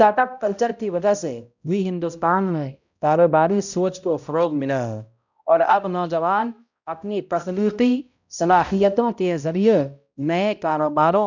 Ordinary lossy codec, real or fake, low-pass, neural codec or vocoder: none; fake; 7.2 kHz; codec, 16 kHz, 0.5 kbps, X-Codec, HuBERT features, trained on balanced general audio